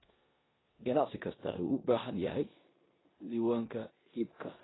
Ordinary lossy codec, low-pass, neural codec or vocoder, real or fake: AAC, 16 kbps; 7.2 kHz; codec, 16 kHz in and 24 kHz out, 0.9 kbps, LongCat-Audio-Codec, four codebook decoder; fake